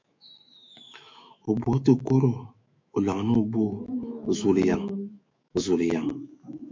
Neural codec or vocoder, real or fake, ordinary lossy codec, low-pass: autoencoder, 48 kHz, 128 numbers a frame, DAC-VAE, trained on Japanese speech; fake; MP3, 64 kbps; 7.2 kHz